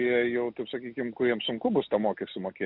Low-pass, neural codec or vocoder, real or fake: 5.4 kHz; none; real